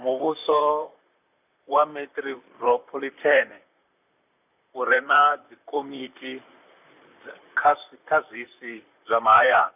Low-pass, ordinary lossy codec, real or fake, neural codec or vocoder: 3.6 kHz; none; fake; vocoder, 44.1 kHz, 128 mel bands, Pupu-Vocoder